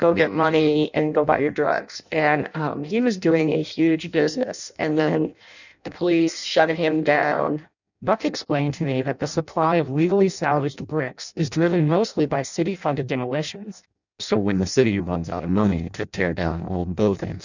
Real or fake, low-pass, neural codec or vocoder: fake; 7.2 kHz; codec, 16 kHz in and 24 kHz out, 0.6 kbps, FireRedTTS-2 codec